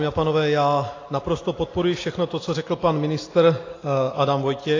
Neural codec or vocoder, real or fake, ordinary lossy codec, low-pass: none; real; AAC, 32 kbps; 7.2 kHz